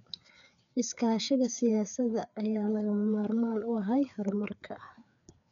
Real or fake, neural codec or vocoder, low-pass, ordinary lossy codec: fake; codec, 16 kHz, 4 kbps, FreqCodec, larger model; 7.2 kHz; none